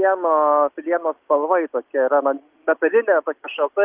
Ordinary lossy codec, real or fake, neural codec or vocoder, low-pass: Opus, 24 kbps; real; none; 3.6 kHz